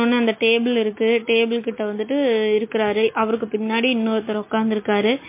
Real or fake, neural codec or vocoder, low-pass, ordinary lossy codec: real; none; 3.6 kHz; MP3, 24 kbps